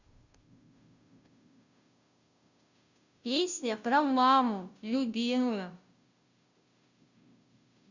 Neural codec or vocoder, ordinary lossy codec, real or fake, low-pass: codec, 16 kHz, 0.5 kbps, FunCodec, trained on Chinese and English, 25 frames a second; Opus, 64 kbps; fake; 7.2 kHz